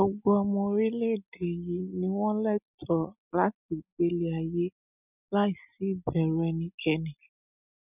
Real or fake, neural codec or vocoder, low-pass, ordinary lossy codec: real; none; 3.6 kHz; none